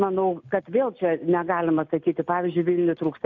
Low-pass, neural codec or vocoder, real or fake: 7.2 kHz; none; real